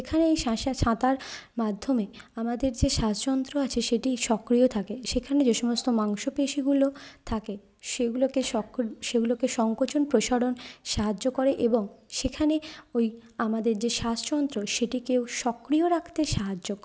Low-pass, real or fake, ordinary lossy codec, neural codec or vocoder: none; real; none; none